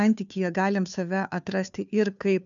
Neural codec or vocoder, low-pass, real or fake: codec, 16 kHz, 2 kbps, FunCodec, trained on Chinese and English, 25 frames a second; 7.2 kHz; fake